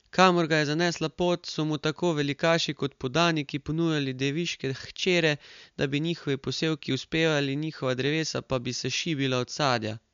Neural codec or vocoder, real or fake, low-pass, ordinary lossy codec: none; real; 7.2 kHz; MP3, 64 kbps